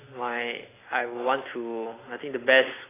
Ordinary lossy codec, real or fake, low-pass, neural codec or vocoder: AAC, 16 kbps; real; 3.6 kHz; none